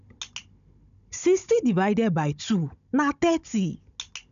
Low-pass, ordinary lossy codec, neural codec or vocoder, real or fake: 7.2 kHz; none; codec, 16 kHz, 16 kbps, FunCodec, trained on Chinese and English, 50 frames a second; fake